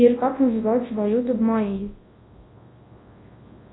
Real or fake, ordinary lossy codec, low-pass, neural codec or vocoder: fake; AAC, 16 kbps; 7.2 kHz; codec, 24 kHz, 0.9 kbps, WavTokenizer, large speech release